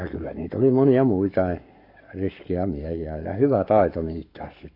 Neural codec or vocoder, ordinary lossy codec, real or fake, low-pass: codec, 44.1 kHz, 7.8 kbps, Pupu-Codec; AAC, 32 kbps; fake; 5.4 kHz